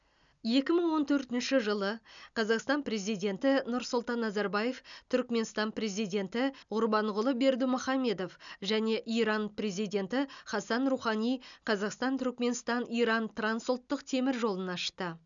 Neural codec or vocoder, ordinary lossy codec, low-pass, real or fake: none; none; 7.2 kHz; real